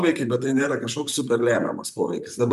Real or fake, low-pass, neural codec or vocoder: fake; 14.4 kHz; vocoder, 44.1 kHz, 128 mel bands, Pupu-Vocoder